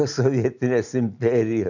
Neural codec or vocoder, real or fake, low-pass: none; real; 7.2 kHz